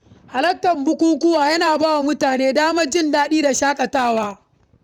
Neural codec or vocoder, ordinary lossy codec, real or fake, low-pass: vocoder, 44.1 kHz, 128 mel bands, Pupu-Vocoder; none; fake; 19.8 kHz